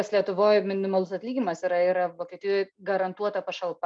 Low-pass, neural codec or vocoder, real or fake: 9.9 kHz; none; real